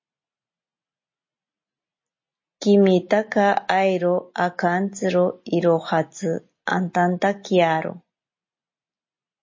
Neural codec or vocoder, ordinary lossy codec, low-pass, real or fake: none; MP3, 32 kbps; 7.2 kHz; real